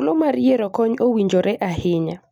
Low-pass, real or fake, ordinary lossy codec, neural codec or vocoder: 19.8 kHz; real; none; none